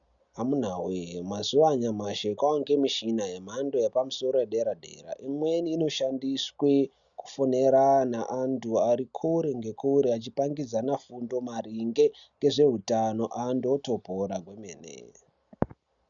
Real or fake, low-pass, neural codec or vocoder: real; 7.2 kHz; none